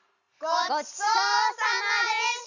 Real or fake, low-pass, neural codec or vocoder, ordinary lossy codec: real; 7.2 kHz; none; none